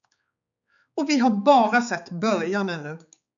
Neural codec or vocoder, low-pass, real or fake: codec, 16 kHz, 4 kbps, X-Codec, HuBERT features, trained on balanced general audio; 7.2 kHz; fake